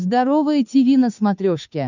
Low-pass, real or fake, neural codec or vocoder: 7.2 kHz; fake; codec, 16 kHz, 8 kbps, FunCodec, trained on Chinese and English, 25 frames a second